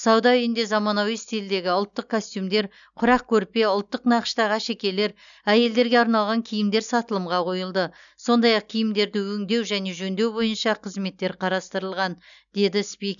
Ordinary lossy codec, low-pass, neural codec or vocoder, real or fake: none; 7.2 kHz; none; real